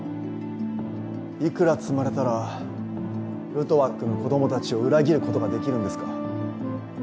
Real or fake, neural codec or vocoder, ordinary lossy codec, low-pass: real; none; none; none